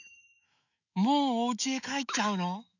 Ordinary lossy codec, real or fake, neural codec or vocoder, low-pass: none; fake; autoencoder, 48 kHz, 128 numbers a frame, DAC-VAE, trained on Japanese speech; 7.2 kHz